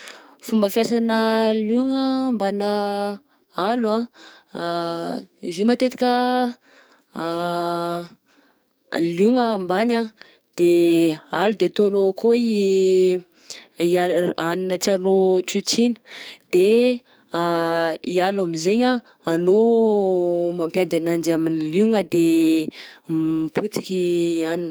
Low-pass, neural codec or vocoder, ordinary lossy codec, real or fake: none; codec, 44.1 kHz, 2.6 kbps, SNAC; none; fake